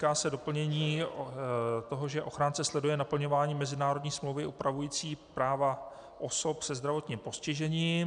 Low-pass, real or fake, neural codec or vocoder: 10.8 kHz; real; none